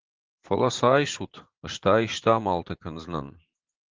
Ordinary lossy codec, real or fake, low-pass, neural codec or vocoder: Opus, 16 kbps; fake; 7.2 kHz; vocoder, 44.1 kHz, 80 mel bands, Vocos